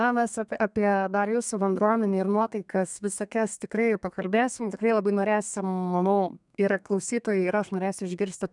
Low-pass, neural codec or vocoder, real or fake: 10.8 kHz; codec, 32 kHz, 1.9 kbps, SNAC; fake